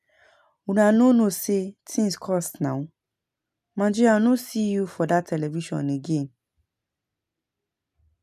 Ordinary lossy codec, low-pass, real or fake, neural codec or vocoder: none; 14.4 kHz; real; none